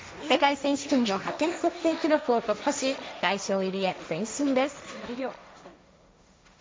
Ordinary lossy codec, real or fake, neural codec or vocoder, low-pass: none; fake; codec, 16 kHz, 1.1 kbps, Voila-Tokenizer; none